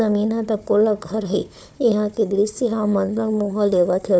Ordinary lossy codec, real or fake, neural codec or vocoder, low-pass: none; fake; codec, 16 kHz, 4 kbps, FunCodec, trained on Chinese and English, 50 frames a second; none